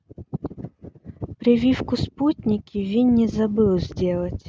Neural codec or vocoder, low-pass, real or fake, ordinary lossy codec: none; none; real; none